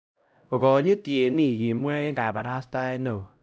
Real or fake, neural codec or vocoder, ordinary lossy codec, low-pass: fake; codec, 16 kHz, 0.5 kbps, X-Codec, HuBERT features, trained on LibriSpeech; none; none